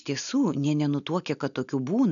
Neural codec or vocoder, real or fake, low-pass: none; real; 7.2 kHz